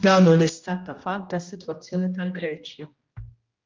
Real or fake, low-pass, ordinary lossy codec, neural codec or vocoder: fake; 7.2 kHz; Opus, 32 kbps; codec, 16 kHz, 1 kbps, X-Codec, HuBERT features, trained on general audio